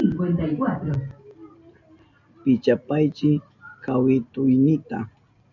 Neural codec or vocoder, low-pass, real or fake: none; 7.2 kHz; real